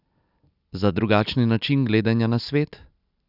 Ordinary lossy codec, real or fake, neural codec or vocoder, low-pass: none; real; none; 5.4 kHz